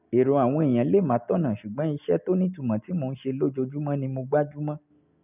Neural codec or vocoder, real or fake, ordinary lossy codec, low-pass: none; real; none; 3.6 kHz